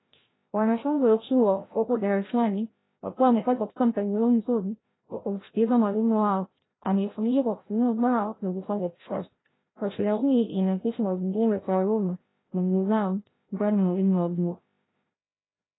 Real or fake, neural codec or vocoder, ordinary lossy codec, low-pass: fake; codec, 16 kHz, 0.5 kbps, FreqCodec, larger model; AAC, 16 kbps; 7.2 kHz